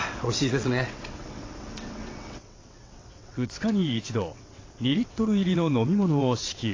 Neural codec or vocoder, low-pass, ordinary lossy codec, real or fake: vocoder, 22.05 kHz, 80 mel bands, WaveNeXt; 7.2 kHz; AAC, 32 kbps; fake